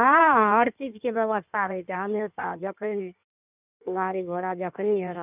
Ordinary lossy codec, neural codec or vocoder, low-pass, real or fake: none; codec, 16 kHz in and 24 kHz out, 1.1 kbps, FireRedTTS-2 codec; 3.6 kHz; fake